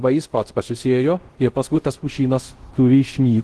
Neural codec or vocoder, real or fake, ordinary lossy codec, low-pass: codec, 24 kHz, 0.5 kbps, DualCodec; fake; Opus, 16 kbps; 10.8 kHz